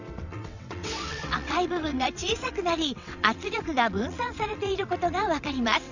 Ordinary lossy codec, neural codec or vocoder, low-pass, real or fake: none; vocoder, 22.05 kHz, 80 mel bands, WaveNeXt; 7.2 kHz; fake